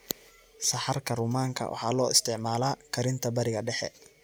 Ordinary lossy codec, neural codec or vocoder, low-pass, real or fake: none; none; none; real